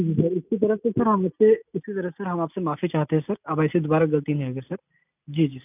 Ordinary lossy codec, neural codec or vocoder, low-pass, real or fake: none; none; 3.6 kHz; real